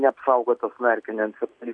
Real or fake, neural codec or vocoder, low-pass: real; none; 9.9 kHz